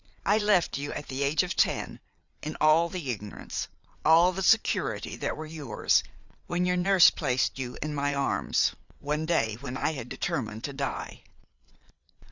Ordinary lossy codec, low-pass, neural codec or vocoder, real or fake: Opus, 64 kbps; 7.2 kHz; vocoder, 22.05 kHz, 80 mel bands, Vocos; fake